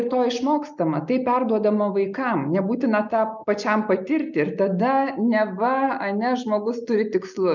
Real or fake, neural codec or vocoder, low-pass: real; none; 7.2 kHz